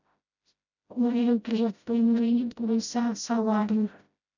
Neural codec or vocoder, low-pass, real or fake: codec, 16 kHz, 0.5 kbps, FreqCodec, smaller model; 7.2 kHz; fake